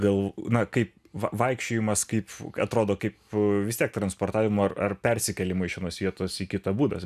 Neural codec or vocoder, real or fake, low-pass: none; real; 14.4 kHz